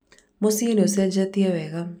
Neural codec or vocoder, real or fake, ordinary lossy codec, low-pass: none; real; none; none